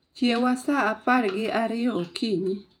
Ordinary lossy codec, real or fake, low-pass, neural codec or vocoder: none; fake; 19.8 kHz; vocoder, 48 kHz, 128 mel bands, Vocos